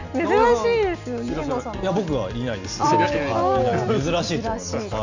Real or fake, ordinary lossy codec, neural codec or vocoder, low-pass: real; none; none; 7.2 kHz